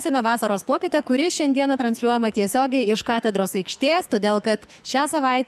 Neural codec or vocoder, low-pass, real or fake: codec, 44.1 kHz, 2.6 kbps, SNAC; 14.4 kHz; fake